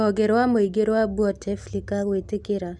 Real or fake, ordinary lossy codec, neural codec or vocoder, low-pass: real; none; none; none